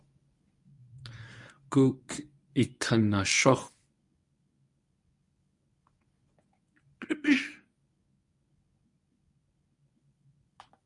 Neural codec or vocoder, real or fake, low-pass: codec, 24 kHz, 0.9 kbps, WavTokenizer, medium speech release version 1; fake; 10.8 kHz